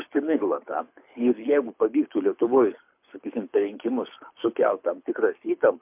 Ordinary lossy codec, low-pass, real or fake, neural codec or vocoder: MP3, 32 kbps; 3.6 kHz; fake; codec, 24 kHz, 6 kbps, HILCodec